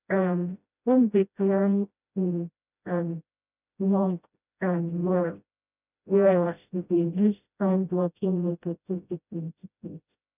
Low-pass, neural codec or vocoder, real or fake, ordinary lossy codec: 3.6 kHz; codec, 16 kHz, 0.5 kbps, FreqCodec, smaller model; fake; none